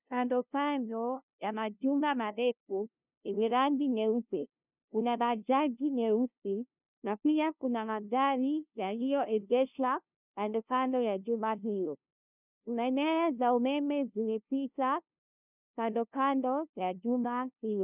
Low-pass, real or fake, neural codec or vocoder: 3.6 kHz; fake; codec, 16 kHz, 0.5 kbps, FunCodec, trained on LibriTTS, 25 frames a second